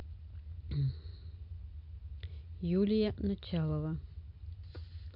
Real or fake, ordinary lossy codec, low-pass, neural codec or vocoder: real; MP3, 48 kbps; 5.4 kHz; none